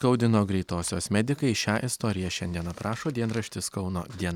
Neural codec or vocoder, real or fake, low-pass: none; real; 19.8 kHz